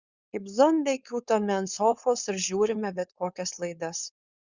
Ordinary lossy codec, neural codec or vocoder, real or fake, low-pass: Opus, 64 kbps; codec, 16 kHz, 4.8 kbps, FACodec; fake; 7.2 kHz